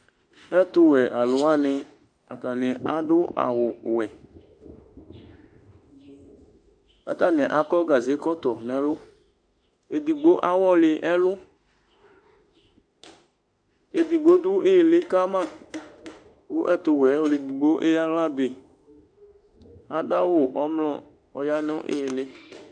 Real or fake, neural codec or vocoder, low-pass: fake; autoencoder, 48 kHz, 32 numbers a frame, DAC-VAE, trained on Japanese speech; 9.9 kHz